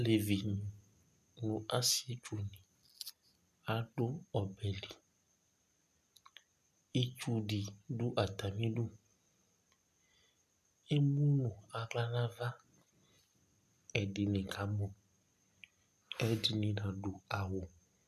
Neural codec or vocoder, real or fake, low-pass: none; real; 14.4 kHz